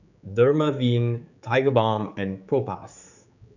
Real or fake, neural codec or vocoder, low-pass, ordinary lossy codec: fake; codec, 16 kHz, 4 kbps, X-Codec, HuBERT features, trained on general audio; 7.2 kHz; none